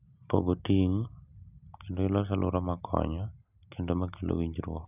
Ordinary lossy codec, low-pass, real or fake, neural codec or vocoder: none; 3.6 kHz; real; none